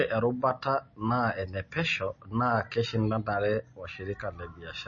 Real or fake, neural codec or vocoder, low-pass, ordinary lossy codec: real; none; 7.2 kHz; MP3, 32 kbps